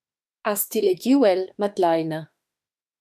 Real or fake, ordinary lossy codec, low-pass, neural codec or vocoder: fake; AAC, 96 kbps; 14.4 kHz; autoencoder, 48 kHz, 32 numbers a frame, DAC-VAE, trained on Japanese speech